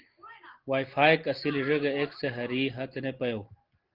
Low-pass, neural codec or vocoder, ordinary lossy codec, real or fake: 5.4 kHz; none; Opus, 16 kbps; real